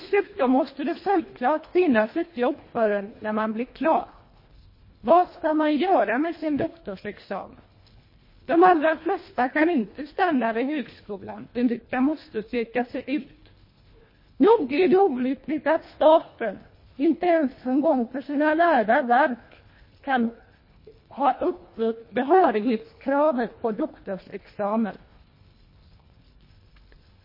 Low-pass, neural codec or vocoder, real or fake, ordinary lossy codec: 5.4 kHz; codec, 24 kHz, 1.5 kbps, HILCodec; fake; MP3, 24 kbps